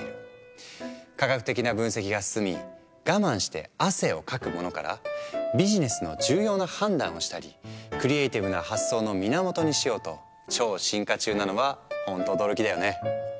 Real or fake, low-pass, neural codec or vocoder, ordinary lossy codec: real; none; none; none